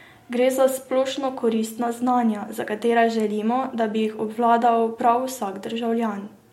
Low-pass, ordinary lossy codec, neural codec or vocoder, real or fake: 19.8 kHz; MP3, 64 kbps; none; real